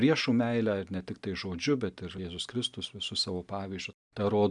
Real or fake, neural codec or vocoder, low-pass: real; none; 10.8 kHz